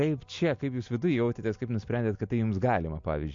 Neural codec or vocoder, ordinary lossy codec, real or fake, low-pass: none; MP3, 48 kbps; real; 7.2 kHz